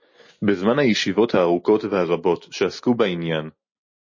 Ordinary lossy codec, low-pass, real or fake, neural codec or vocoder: MP3, 32 kbps; 7.2 kHz; real; none